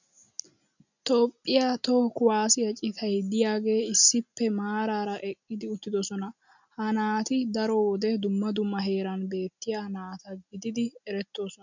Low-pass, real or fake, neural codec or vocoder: 7.2 kHz; real; none